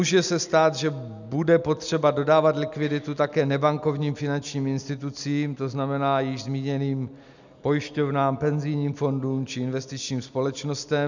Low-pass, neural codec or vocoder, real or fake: 7.2 kHz; none; real